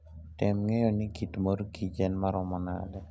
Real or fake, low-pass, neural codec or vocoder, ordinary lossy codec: real; none; none; none